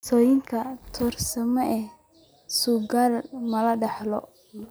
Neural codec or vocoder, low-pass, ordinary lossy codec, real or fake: none; none; none; real